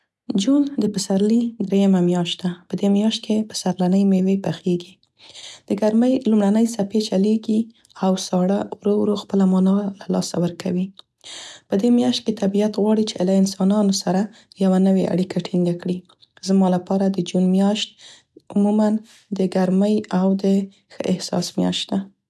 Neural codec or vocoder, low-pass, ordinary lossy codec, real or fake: none; none; none; real